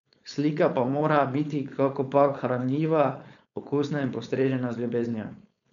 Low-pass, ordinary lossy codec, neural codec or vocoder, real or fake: 7.2 kHz; none; codec, 16 kHz, 4.8 kbps, FACodec; fake